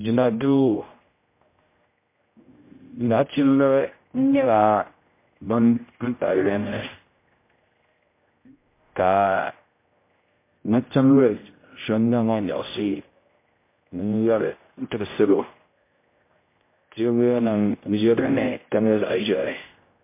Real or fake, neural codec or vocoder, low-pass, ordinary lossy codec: fake; codec, 16 kHz, 0.5 kbps, X-Codec, HuBERT features, trained on general audio; 3.6 kHz; MP3, 24 kbps